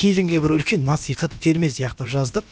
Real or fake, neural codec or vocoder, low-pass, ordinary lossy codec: fake; codec, 16 kHz, about 1 kbps, DyCAST, with the encoder's durations; none; none